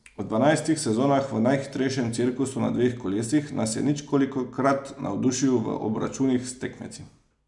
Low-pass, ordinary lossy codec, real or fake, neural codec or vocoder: 10.8 kHz; none; real; none